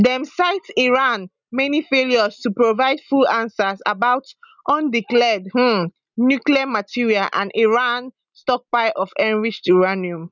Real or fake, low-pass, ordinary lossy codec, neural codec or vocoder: real; 7.2 kHz; none; none